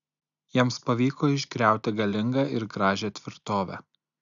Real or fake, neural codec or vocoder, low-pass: real; none; 7.2 kHz